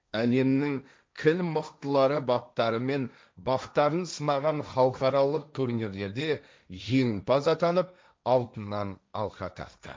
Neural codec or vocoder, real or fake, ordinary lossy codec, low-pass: codec, 16 kHz, 1.1 kbps, Voila-Tokenizer; fake; none; none